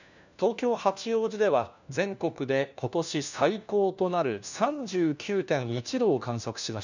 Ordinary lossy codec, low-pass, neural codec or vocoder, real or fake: none; 7.2 kHz; codec, 16 kHz, 1 kbps, FunCodec, trained on LibriTTS, 50 frames a second; fake